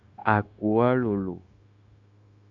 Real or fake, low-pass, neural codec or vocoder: fake; 7.2 kHz; codec, 16 kHz, 0.9 kbps, LongCat-Audio-Codec